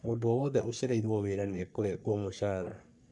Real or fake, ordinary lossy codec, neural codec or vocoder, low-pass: fake; none; codec, 44.1 kHz, 3.4 kbps, Pupu-Codec; 10.8 kHz